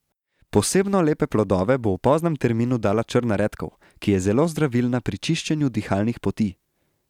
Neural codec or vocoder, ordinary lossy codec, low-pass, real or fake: none; none; 19.8 kHz; real